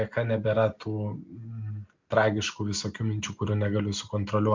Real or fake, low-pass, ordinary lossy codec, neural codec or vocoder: real; 7.2 kHz; MP3, 64 kbps; none